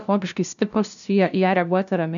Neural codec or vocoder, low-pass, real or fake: codec, 16 kHz, 0.5 kbps, FunCodec, trained on LibriTTS, 25 frames a second; 7.2 kHz; fake